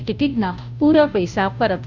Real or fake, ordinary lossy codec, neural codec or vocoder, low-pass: fake; none; codec, 16 kHz, 0.5 kbps, FunCodec, trained on Chinese and English, 25 frames a second; 7.2 kHz